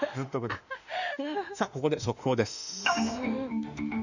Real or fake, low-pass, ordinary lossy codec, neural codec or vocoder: fake; 7.2 kHz; none; autoencoder, 48 kHz, 32 numbers a frame, DAC-VAE, trained on Japanese speech